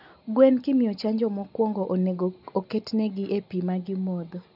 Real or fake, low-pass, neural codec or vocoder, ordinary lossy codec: real; 5.4 kHz; none; none